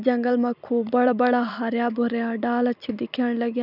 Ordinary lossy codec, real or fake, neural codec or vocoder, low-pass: none; fake; vocoder, 44.1 kHz, 128 mel bands every 256 samples, BigVGAN v2; 5.4 kHz